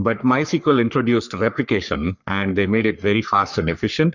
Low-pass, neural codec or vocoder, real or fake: 7.2 kHz; codec, 44.1 kHz, 3.4 kbps, Pupu-Codec; fake